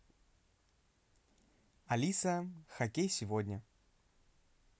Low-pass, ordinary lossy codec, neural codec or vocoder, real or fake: none; none; none; real